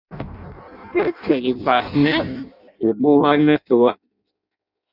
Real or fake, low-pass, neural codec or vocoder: fake; 5.4 kHz; codec, 16 kHz in and 24 kHz out, 0.6 kbps, FireRedTTS-2 codec